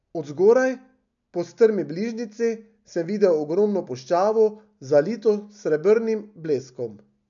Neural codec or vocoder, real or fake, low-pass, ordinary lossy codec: none; real; 7.2 kHz; none